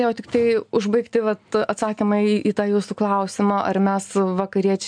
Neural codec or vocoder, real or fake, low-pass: none; real; 9.9 kHz